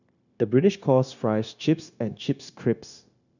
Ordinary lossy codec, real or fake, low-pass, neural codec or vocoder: none; fake; 7.2 kHz; codec, 16 kHz, 0.9 kbps, LongCat-Audio-Codec